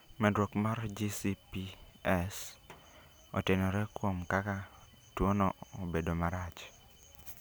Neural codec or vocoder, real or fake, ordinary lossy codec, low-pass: none; real; none; none